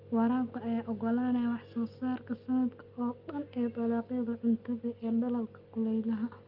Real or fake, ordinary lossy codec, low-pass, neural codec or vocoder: real; Opus, 32 kbps; 5.4 kHz; none